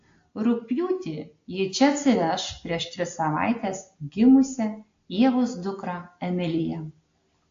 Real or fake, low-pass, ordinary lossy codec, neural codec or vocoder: real; 7.2 kHz; AAC, 48 kbps; none